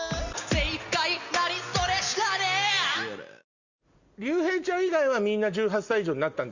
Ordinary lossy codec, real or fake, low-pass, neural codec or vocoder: Opus, 64 kbps; real; 7.2 kHz; none